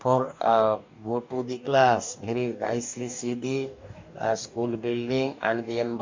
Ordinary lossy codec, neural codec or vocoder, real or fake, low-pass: MP3, 64 kbps; codec, 44.1 kHz, 2.6 kbps, DAC; fake; 7.2 kHz